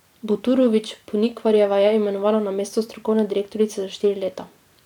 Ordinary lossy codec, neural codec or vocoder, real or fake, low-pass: none; vocoder, 44.1 kHz, 128 mel bands every 256 samples, BigVGAN v2; fake; 19.8 kHz